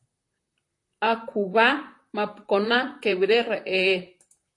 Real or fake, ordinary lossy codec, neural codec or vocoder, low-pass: fake; AAC, 48 kbps; vocoder, 44.1 kHz, 128 mel bands, Pupu-Vocoder; 10.8 kHz